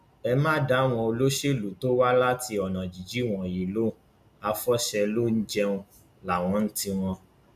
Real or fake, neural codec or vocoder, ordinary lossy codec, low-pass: real; none; none; 14.4 kHz